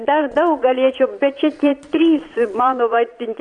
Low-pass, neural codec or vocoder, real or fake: 9.9 kHz; vocoder, 22.05 kHz, 80 mel bands, Vocos; fake